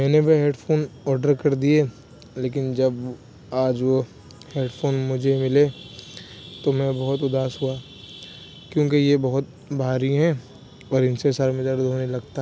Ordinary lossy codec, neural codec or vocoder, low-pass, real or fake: none; none; none; real